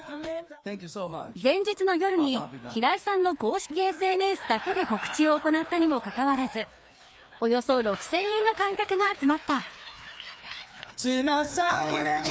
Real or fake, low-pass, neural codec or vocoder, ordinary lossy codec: fake; none; codec, 16 kHz, 2 kbps, FreqCodec, larger model; none